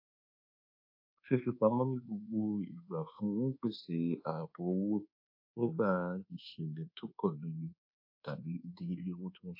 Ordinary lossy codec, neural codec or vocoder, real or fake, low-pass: AAC, 48 kbps; codec, 24 kHz, 1.2 kbps, DualCodec; fake; 5.4 kHz